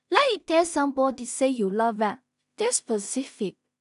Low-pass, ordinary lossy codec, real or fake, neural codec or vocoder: 10.8 kHz; none; fake; codec, 16 kHz in and 24 kHz out, 0.4 kbps, LongCat-Audio-Codec, two codebook decoder